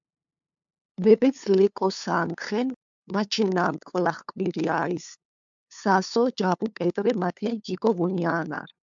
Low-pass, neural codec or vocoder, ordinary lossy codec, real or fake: 7.2 kHz; codec, 16 kHz, 2 kbps, FunCodec, trained on LibriTTS, 25 frames a second; MP3, 64 kbps; fake